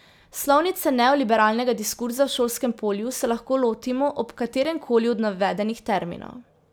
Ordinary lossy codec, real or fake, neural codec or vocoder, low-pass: none; real; none; none